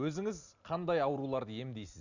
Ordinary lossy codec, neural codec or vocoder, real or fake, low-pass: none; none; real; 7.2 kHz